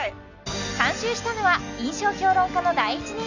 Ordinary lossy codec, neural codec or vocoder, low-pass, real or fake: none; none; 7.2 kHz; real